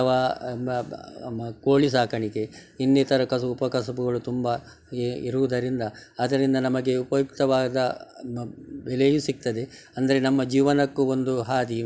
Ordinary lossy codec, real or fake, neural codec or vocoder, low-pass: none; real; none; none